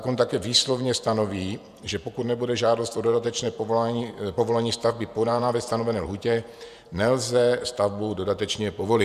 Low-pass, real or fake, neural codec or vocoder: 14.4 kHz; fake; vocoder, 48 kHz, 128 mel bands, Vocos